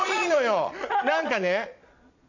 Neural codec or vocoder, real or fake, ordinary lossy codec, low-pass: vocoder, 22.05 kHz, 80 mel bands, Vocos; fake; none; 7.2 kHz